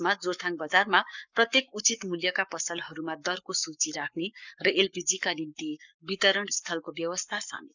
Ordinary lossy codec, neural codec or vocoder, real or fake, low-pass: none; codec, 44.1 kHz, 7.8 kbps, Pupu-Codec; fake; 7.2 kHz